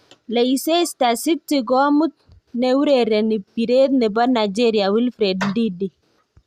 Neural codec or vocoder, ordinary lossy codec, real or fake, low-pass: none; Opus, 64 kbps; real; 14.4 kHz